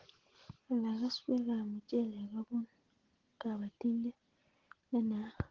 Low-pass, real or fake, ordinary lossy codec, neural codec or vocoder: 7.2 kHz; real; Opus, 16 kbps; none